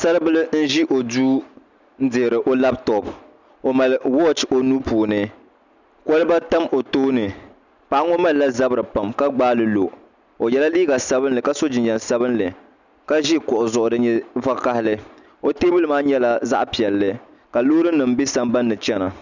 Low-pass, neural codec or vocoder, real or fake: 7.2 kHz; none; real